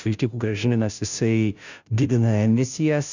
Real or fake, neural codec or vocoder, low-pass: fake; codec, 16 kHz, 0.5 kbps, FunCodec, trained on Chinese and English, 25 frames a second; 7.2 kHz